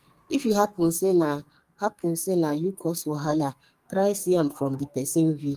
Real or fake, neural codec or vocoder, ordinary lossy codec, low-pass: fake; codec, 44.1 kHz, 2.6 kbps, SNAC; Opus, 32 kbps; 14.4 kHz